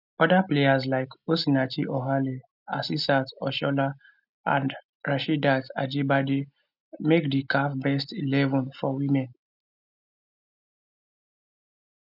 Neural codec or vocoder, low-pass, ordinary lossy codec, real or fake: none; 5.4 kHz; none; real